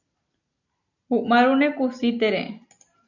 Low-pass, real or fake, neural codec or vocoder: 7.2 kHz; real; none